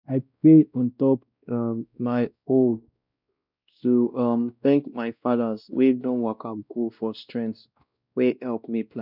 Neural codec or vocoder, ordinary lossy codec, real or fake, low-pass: codec, 16 kHz, 1 kbps, X-Codec, WavLM features, trained on Multilingual LibriSpeech; none; fake; 5.4 kHz